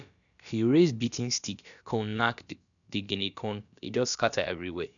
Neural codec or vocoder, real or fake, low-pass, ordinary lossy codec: codec, 16 kHz, about 1 kbps, DyCAST, with the encoder's durations; fake; 7.2 kHz; none